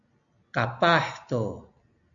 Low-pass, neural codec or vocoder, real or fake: 7.2 kHz; none; real